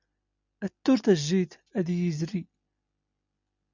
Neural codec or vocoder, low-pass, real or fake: none; 7.2 kHz; real